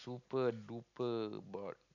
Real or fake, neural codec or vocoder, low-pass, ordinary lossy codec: real; none; 7.2 kHz; none